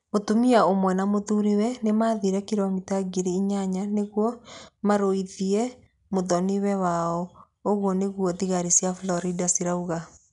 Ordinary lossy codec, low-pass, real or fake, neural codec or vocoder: none; 10.8 kHz; real; none